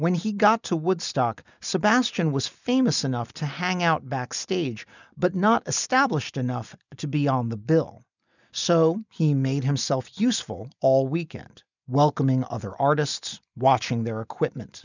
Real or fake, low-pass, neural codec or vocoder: real; 7.2 kHz; none